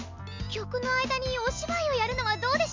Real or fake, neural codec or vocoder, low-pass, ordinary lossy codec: real; none; 7.2 kHz; MP3, 64 kbps